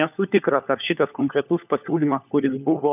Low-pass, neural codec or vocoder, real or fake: 3.6 kHz; codec, 16 kHz, 4 kbps, FunCodec, trained on LibriTTS, 50 frames a second; fake